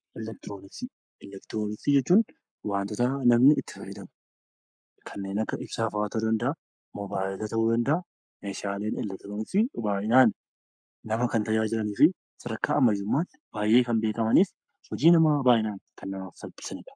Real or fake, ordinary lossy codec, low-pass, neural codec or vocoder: fake; MP3, 96 kbps; 9.9 kHz; codec, 44.1 kHz, 7.8 kbps, Pupu-Codec